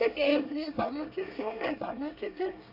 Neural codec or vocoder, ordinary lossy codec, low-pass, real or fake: codec, 24 kHz, 1 kbps, SNAC; none; 5.4 kHz; fake